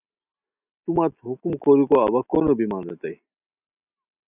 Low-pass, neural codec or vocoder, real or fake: 3.6 kHz; none; real